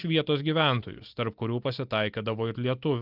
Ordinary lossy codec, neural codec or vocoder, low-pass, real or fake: Opus, 24 kbps; none; 5.4 kHz; real